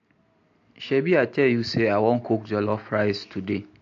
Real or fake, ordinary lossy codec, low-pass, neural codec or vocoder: real; AAC, 48 kbps; 7.2 kHz; none